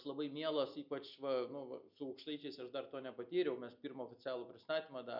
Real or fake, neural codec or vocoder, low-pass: real; none; 5.4 kHz